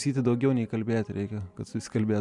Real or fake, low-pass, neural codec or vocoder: real; 10.8 kHz; none